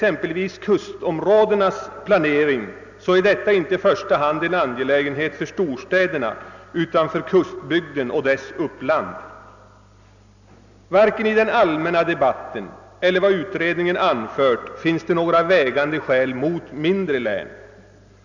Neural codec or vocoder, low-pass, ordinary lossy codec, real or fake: none; 7.2 kHz; none; real